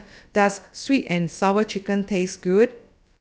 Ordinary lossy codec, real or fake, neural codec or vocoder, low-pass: none; fake; codec, 16 kHz, about 1 kbps, DyCAST, with the encoder's durations; none